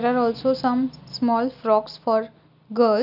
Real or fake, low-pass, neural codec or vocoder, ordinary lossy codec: real; 5.4 kHz; none; none